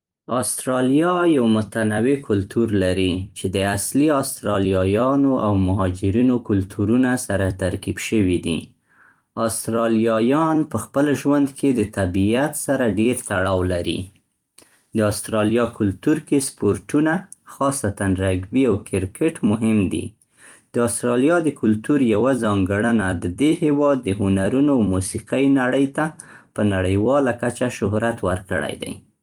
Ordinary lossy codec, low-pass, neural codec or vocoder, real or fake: Opus, 32 kbps; 19.8 kHz; vocoder, 44.1 kHz, 128 mel bands every 256 samples, BigVGAN v2; fake